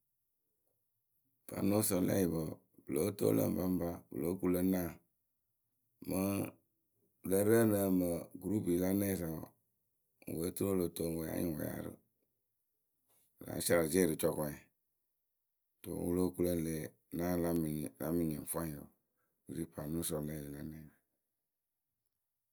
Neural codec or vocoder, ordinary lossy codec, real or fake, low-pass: none; none; real; none